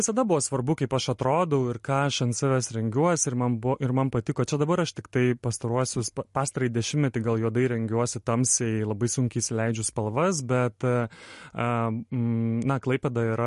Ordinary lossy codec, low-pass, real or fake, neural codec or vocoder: MP3, 48 kbps; 14.4 kHz; real; none